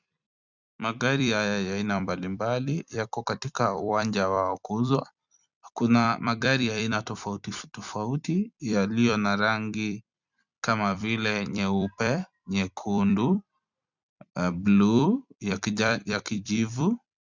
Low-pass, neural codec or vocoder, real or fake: 7.2 kHz; vocoder, 44.1 kHz, 128 mel bands every 256 samples, BigVGAN v2; fake